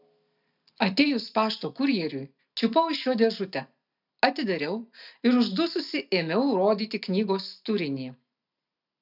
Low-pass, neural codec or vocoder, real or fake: 5.4 kHz; none; real